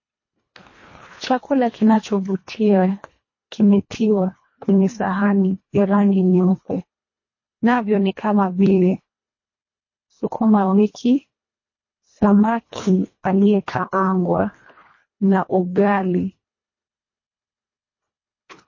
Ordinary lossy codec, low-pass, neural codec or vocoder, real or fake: MP3, 32 kbps; 7.2 kHz; codec, 24 kHz, 1.5 kbps, HILCodec; fake